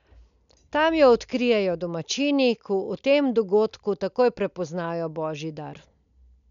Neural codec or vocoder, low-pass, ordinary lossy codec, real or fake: none; 7.2 kHz; none; real